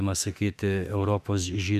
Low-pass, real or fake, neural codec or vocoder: 14.4 kHz; fake; autoencoder, 48 kHz, 32 numbers a frame, DAC-VAE, trained on Japanese speech